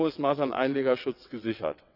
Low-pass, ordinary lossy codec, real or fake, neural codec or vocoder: 5.4 kHz; none; fake; vocoder, 22.05 kHz, 80 mel bands, WaveNeXt